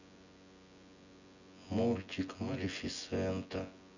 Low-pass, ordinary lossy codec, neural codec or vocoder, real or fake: 7.2 kHz; none; vocoder, 24 kHz, 100 mel bands, Vocos; fake